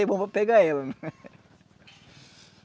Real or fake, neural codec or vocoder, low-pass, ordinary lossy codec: real; none; none; none